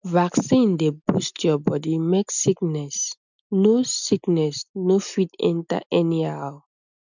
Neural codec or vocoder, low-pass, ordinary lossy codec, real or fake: none; 7.2 kHz; none; real